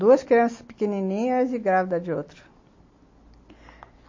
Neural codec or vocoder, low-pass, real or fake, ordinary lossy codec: none; 7.2 kHz; real; MP3, 32 kbps